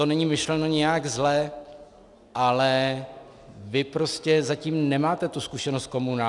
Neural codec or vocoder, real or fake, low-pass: none; real; 10.8 kHz